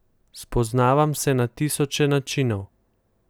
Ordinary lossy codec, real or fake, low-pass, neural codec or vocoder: none; real; none; none